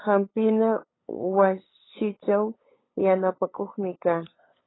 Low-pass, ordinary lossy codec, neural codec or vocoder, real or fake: 7.2 kHz; AAC, 16 kbps; codec, 16 kHz, 8 kbps, FunCodec, trained on LibriTTS, 25 frames a second; fake